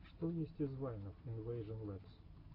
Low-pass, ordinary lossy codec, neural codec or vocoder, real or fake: 5.4 kHz; AAC, 24 kbps; none; real